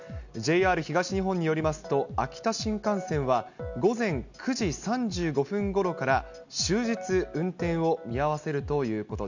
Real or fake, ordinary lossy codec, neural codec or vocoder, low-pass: real; none; none; 7.2 kHz